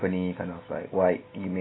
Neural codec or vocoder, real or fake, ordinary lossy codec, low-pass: none; real; AAC, 16 kbps; 7.2 kHz